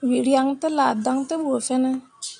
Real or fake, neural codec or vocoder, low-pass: real; none; 9.9 kHz